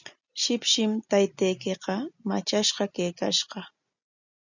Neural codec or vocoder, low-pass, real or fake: none; 7.2 kHz; real